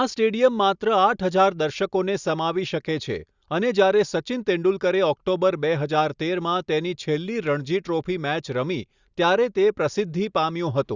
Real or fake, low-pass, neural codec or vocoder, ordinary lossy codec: real; 7.2 kHz; none; Opus, 64 kbps